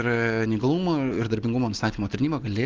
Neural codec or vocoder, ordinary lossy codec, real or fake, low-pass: none; Opus, 32 kbps; real; 7.2 kHz